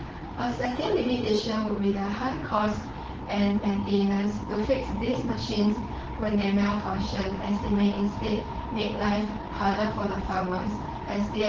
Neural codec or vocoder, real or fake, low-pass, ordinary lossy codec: codec, 16 kHz, 4 kbps, FreqCodec, larger model; fake; 7.2 kHz; Opus, 16 kbps